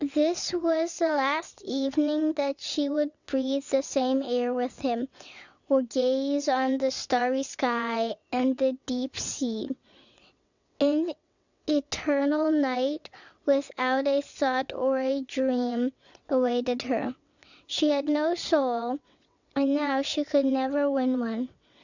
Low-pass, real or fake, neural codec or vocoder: 7.2 kHz; fake; vocoder, 22.05 kHz, 80 mel bands, WaveNeXt